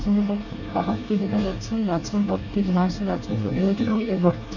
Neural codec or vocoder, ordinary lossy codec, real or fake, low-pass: codec, 24 kHz, 1 kbps, SNAC; none; fake; 7.2 kHz